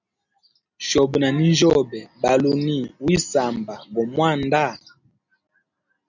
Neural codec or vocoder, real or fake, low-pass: none; real; 7.2 kHz